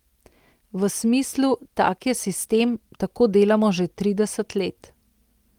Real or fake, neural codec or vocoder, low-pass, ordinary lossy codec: real; none; 19.8 kHz; Opus, 24 kbps